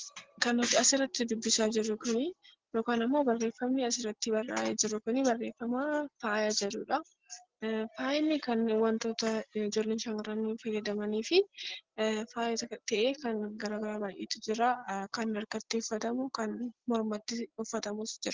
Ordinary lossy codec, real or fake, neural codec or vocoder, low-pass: Opus, 16 kbps; real; none; 7.2 kHz